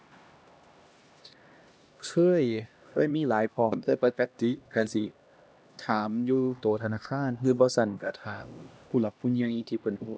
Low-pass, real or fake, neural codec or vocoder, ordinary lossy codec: none; fake; codec, 16 kHz, 1 kbps, X-Codec, HuBERT features, trained on LibriSpeech; none